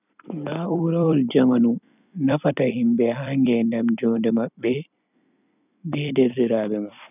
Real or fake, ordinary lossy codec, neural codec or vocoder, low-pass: fake; none; vocoder, 44.1 kHz, 128 mel bands every 256 samples, BigVGAN v2; 3.6 kHz